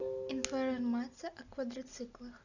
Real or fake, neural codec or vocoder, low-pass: real; none; 7.2 kHz